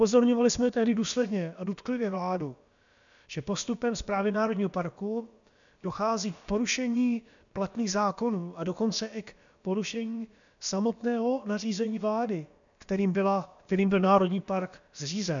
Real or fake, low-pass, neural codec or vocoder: fake; 7.2 kHz; codec, 16 kHz, about 1 kbps, DyCAST, with the encoder's durations